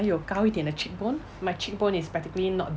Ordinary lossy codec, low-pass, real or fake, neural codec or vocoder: none; none; real; none